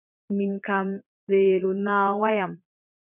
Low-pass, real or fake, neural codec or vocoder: 3.6 kHz; fake; vocoder, 22.05 kHz, 80 mel bands, Vocos